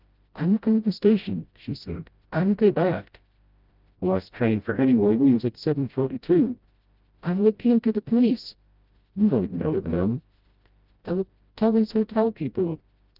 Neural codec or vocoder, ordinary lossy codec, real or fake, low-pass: codec, 16 kHz, 0.5 kbps, FreqCodec, smaller model; Opus, 24 kbps; fake; 5.4 kHz